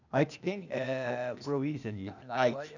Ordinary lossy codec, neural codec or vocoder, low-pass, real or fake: none; codec, 16 kHz, 0.8 kbps, ZipCodec; 7.2 kHz; fake